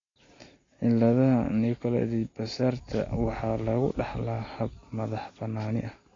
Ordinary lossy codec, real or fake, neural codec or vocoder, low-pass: AAC, 32 kbps; real; none; 7.2 kHz